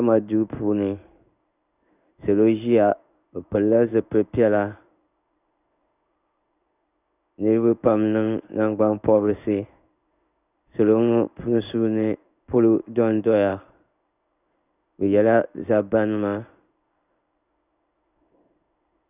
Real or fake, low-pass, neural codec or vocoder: fake; 3.6 kHz; codec, 16 kHz in and 24 kHz out, 1 kbps, XY-Tokenizer